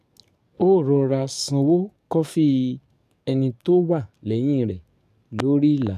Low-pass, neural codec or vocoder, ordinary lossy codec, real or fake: 14.4 kHz; codec, 44.1 kHz, 7.8 kbps, DAC; none; fake